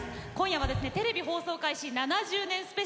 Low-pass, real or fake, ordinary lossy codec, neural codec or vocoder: none; real; none; none